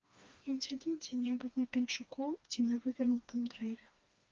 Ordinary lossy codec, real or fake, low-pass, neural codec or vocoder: Opus, 24 kbps; fake; 7.2 kHz; codec, 16 kHz, 2 kbps, FreqCodec, smaller model